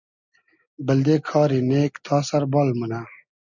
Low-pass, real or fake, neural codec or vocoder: 7.2 kHz; real; none